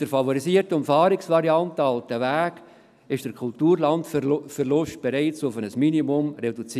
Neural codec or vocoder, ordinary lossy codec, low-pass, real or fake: none; none; 14.4 kHz; real